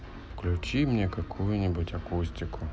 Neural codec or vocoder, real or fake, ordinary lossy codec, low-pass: none; real; none; none